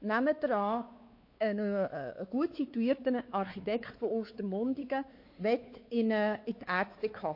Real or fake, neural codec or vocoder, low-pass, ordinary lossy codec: fake; codec, 16 kHz, 4 kbps, X-Codec, WavLM features, trained on Multilingual LibriSpeech; 5.4 kHz; MP3, 32 kbps